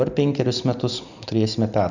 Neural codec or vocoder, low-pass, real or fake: none; 7.2 kHz; real